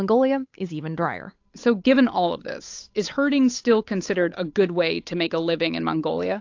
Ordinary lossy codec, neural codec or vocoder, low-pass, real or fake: AAC, 48 kbps; codec, 16 kHz, 8 kbps, FunCodec, trained on Chinese and English, 25 frames a second; 7.2 kHz; fake